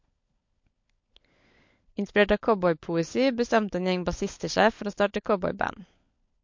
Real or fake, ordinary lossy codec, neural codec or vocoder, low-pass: fake; MP3, 48 kbps; codec, 16 kHz, 16 kbps, FunCodec, trained on LibriTTS, 50 frames a second; 7.2 kHz